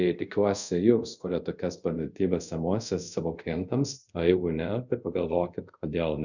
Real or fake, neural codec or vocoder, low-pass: fake; codec, 24 kHz, 0.5 kbps, DualCodec; 7.2 kHz